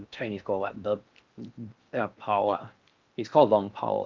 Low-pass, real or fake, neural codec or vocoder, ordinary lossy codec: 7.2 kHz; fake; codec, 16 kHz in and 24 kHz out, 0.6 kbps, FocalCodec, streaming, 4096 codes; Opus, 24 kbps